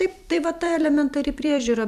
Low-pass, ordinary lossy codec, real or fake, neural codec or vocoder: 14.4 kHz; MP3, 96 kbps; fake; vocoder, 44.1 kHz, 128 mel bands every 256 samples, BigVGAN v2